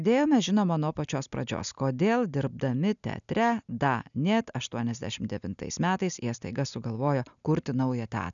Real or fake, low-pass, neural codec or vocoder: real; 7.2 kHz; none